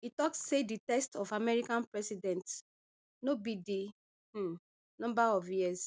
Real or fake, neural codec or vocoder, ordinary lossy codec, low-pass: real; none; none; none